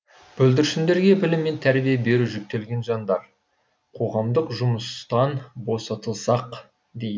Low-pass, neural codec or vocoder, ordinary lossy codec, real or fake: none; none; none; real